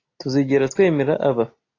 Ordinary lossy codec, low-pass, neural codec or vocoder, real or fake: AAC, 32 kbps; 7.2 kHz; none; real